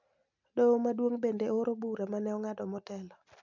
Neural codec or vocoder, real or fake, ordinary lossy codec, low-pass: none; real; none; 7.2 kHz